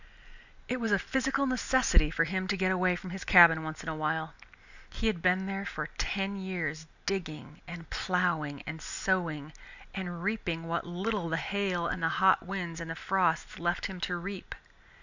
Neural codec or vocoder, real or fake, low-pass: none; real; 7.2 kHz